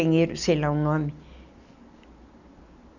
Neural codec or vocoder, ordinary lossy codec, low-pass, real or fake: none; none; 7.2 kHz; real